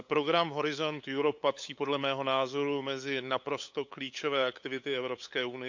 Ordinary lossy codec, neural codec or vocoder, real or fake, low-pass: MP3, 64 kbps; codec, 16 kHz, 8 kbps, FunCodec, trained on LibriTTS, 25 frames a second; fake; 7.2 kHz